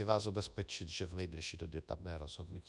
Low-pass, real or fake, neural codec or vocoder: 10.8 kHz; fake; codec, 24 kHz, 0.9 kbps, WavTokenizer, large speech release